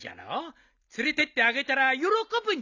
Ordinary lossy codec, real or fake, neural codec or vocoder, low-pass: none; real; none; 7.2 kHz